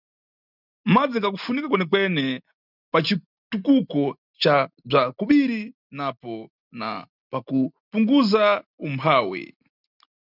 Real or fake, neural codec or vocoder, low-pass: real; none; 5.4 kHz